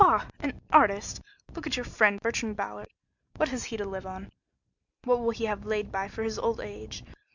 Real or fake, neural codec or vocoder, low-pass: real; none; 7.2 kHz